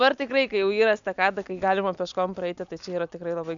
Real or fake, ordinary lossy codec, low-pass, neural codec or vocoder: real; MP3, 96 kbps; 7.2 kHz; none